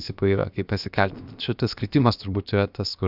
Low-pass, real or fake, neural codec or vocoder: 5.4 kHz; fake; codec, 16 kHz, about 1 kbps, DyCAST, with the encoder's durations